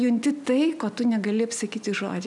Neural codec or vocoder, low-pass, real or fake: none; 10.8 kHz; real